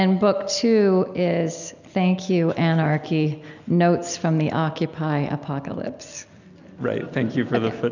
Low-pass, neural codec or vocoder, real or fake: 7.2 kHz; none; real